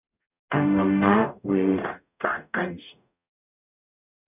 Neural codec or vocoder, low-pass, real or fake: codec, 44.1 kHz, 0.9 kbps, DAC; 3.6 kHz; fake